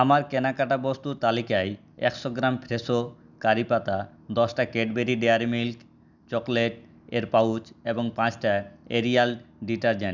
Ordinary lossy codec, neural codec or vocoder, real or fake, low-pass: none; none; real; 7.2 kHz